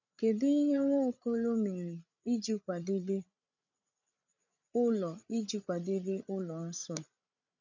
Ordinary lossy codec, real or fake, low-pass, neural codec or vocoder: none; fake; 7.2 kHz; codec, 16 kHz, 8 kbps, FreqCodec, larger model